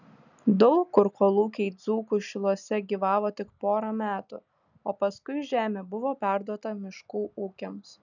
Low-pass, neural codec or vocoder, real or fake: 7.2 kHz; none; real